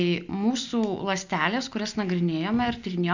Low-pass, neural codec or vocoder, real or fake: 7.2 kHz; none; real